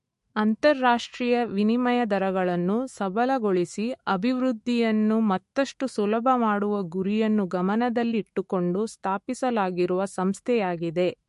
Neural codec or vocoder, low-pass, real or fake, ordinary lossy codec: autoencoder, 48 kHz, 128 numbers a frame, DAC-VAE, trained on Japanese speech; 14.4 kHz; fake; MP3, 48 kbps